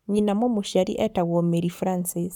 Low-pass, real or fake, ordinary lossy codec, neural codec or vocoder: 19.8 kHz; fake; none; codec, 44.1 kHz, 7.8 kbps, Pupu-Codec